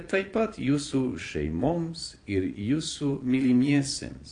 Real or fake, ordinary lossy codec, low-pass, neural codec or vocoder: fake; AAC, 48 kbps; 9.9 kHz; vocoder, 22.05 kHz, 80 mel bands, Vocos